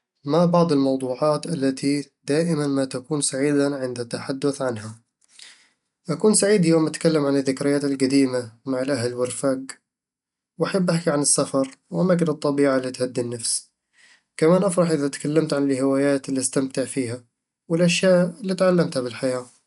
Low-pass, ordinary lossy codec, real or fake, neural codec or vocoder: 10.8 kHz; none; real; none